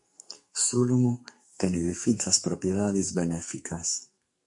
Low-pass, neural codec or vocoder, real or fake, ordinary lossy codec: 10.8 kHz; codec, 44.1 kHz, 2.6 kbps, SNAC; fake; MP3, 48 kbps